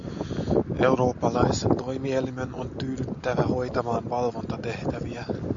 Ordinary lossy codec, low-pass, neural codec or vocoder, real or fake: AAC, 64 kbps; 7.2 kHz; none; real